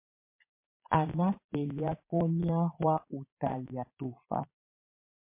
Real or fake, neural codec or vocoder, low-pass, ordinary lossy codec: fake; vocoder, 22.05 kHz, 80 mel bands, Vocos; 3.6 kHz; MP3, 24 kbps